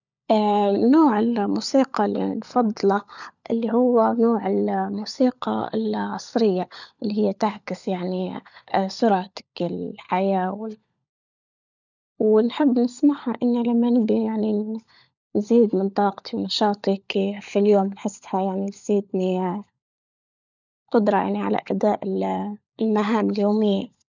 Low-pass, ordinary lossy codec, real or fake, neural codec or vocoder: 7.2 kHz; none; fake; codec, 16 kHz, 16 kbps, FunCodec, trained on LibriTTS, 50 frames a second